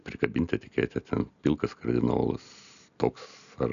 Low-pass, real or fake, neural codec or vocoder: 7.2 kHz; real; none